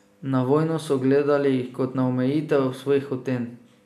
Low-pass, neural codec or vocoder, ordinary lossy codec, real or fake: 14.4 kHz; none; none; real